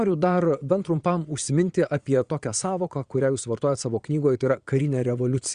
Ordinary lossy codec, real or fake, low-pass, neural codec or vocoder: Opus, 64 kbps; fake; 9.9 kHz; vocoder, 22.05 kHz, 80 mel bands, Vocos